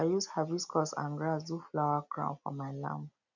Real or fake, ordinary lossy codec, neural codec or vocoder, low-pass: real; none; none; 7.2 kHz